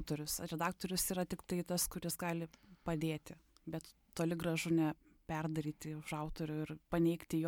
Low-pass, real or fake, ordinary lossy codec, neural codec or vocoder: 19.8 kHz; real; MP3, 96 kbps; none